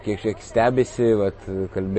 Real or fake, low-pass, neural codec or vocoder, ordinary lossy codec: real; 10.8 kHz; none; MP3, 32 kbps